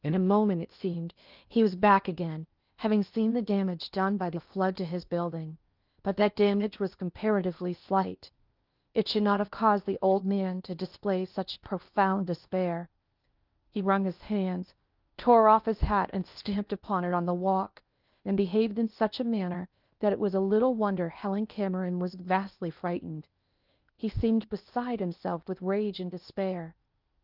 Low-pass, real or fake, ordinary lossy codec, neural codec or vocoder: 5.4 kHz; fake; Opus, 24 kbps; codec, 16 kHz in and 24 kHz out, 0.8 kbps, FocalCodec, streaming, 65536 codes